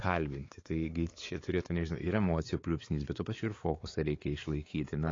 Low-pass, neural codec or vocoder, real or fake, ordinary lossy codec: 7.2 kHz; codec, 16 kHz, 4 kbps, X-Codec, WavLM features, trained on Multilingual LibriSpeech; fake; AAC, 32 kbps